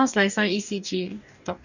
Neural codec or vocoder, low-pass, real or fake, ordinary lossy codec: codec, 44.1 kHz, 2.6 kbps, DAC; 7.2 kHz; fake; none